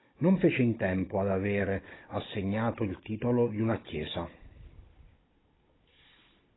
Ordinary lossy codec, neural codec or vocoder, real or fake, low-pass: AAC, 16 kbps; codec, 16 kHz, 16 kbps, FunCodec, trained on Chinese and English, 50 frames a second; fake; 7.2 kHz